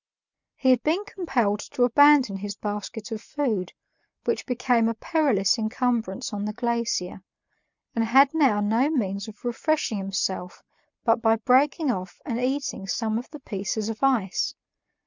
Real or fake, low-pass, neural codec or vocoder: real; 7.2 kHz; none